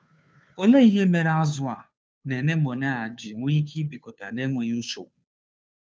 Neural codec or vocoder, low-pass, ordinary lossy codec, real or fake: codec, 16 kHz, 2 kbps, FunCodec, trained on Chinese and English, 25 frames a second; none; none; fake